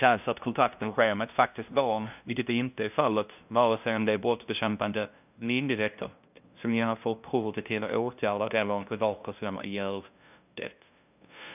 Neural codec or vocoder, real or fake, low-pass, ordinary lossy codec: codec, 16 kHz, 0.5 kbps, FunCodec, trained on LibriTTS, 25 frames a second; fake; 3.6 kHz; none